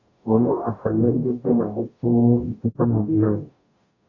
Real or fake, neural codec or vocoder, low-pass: fake; codec, 44.1 kHz, 0.9 kbps, DAC; 7.2 kHz